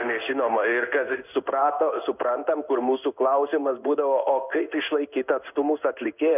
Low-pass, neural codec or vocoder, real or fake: 3.6 kHz; codec, 16 kHz in and 24 kHz out, 1 kbps, XY-Tokenizer; fake